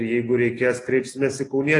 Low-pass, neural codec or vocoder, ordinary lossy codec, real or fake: 10.8 kHz; none; AAC, 32 kbps; real